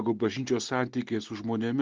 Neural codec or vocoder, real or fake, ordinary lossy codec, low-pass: none; real; Opus, 16 kbps; 7.2 kHz